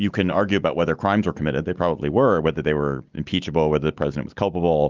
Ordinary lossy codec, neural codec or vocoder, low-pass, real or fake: Opus, 24 kbps; none; 7.2 kHz; real